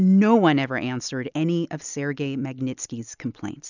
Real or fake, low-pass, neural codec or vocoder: real; 7.2 kHz; none